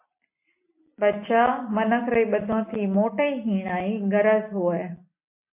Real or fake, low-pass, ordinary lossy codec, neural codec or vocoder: real; 3.6 kHz; MP3, 24 kbps; none